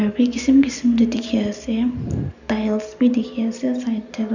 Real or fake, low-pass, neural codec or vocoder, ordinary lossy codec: real; 7.2 kHz; none; none